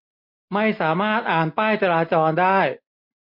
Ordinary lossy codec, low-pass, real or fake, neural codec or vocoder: MP3, 32 kbps; 5.4 kHz; real; none